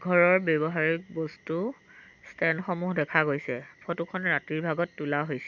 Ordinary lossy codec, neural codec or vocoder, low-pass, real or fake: none; none; 7.2 kHz; real